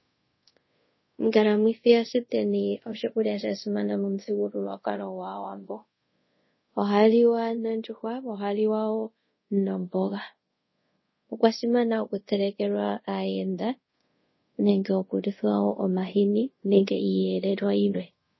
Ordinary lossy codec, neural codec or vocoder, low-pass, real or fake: MP3, 24 kbps; codec, 24 kHz, 0.5 kbps, DualCodec; 7.2 kHz; fake